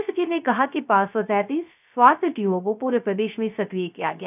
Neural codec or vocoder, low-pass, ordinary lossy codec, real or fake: codec, 16 kHz, 0.3 kbps, FocalCodec; 3.6 kHz; none; fake